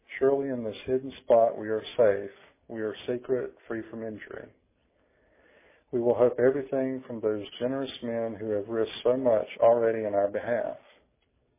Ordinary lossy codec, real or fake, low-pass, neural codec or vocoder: MP3, 16 kbps; real; 3.6 kHz; none